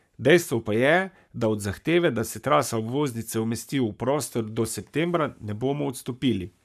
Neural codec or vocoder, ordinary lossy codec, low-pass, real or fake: codec, 44.1 kHz, 7.8 kbps, Pupu-Codec; none; 14.4 kHz; fake